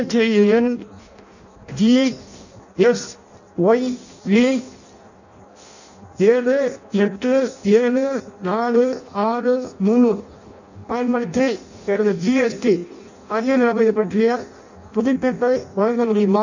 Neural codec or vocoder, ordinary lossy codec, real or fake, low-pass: codec, 16 kHz in and 24 kHz out, 0.6 kbps, FireRedTTS-2 codec; none; fake; 7.2 kHz